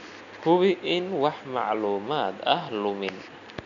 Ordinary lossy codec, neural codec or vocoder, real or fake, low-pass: none; none; real; 7.2 kHz